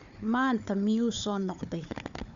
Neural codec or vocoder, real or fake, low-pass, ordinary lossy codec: codec, 16 kHz, 4 kbps, FunCodec, trained on Chinese and English, 50 frames a second; fake; 7.2 kHz; Opus, 64 kbps